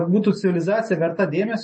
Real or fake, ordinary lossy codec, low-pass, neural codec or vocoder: real; MP3, 32 kbps; 9.9 kHz; none